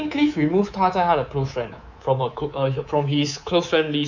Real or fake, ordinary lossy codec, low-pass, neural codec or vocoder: fake; none; 7.2 kHz; codec, 24 kHz, 3.1 kbps, DualCodec